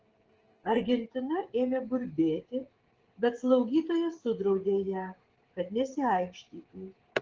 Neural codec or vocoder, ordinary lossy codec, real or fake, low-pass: codec, 16 kHz, 16 kbps, FreqCodec, smaller model; Opus, 16 kbps; fake; 7.2 kHz